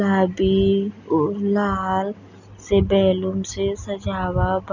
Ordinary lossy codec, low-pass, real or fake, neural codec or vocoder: none; 7.2 kHz; real; none